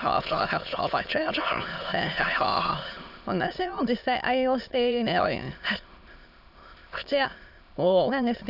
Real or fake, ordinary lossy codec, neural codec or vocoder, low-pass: fake; none; autoencoder, 22.05 kHz, a latent of 192 numbers a frame, VITS, trained on many speakers; 5.4 kHz